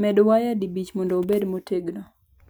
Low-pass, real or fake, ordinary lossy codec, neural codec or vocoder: none; real; none; none